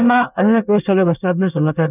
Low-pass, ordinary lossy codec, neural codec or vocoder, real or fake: 3.6 kHz; none; codec, 24 kHz, 1 kbps, SNAC; fake